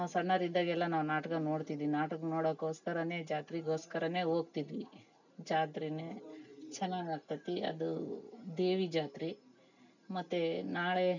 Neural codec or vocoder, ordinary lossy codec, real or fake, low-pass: none; none; real; 7.2 kHz